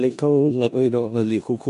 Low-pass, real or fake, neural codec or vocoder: 10.8 kHz; fake; codec, 16 kHz in and 24 kHz out, 0.4 kbps, LongCat-Audio-Codec, four codebook decoder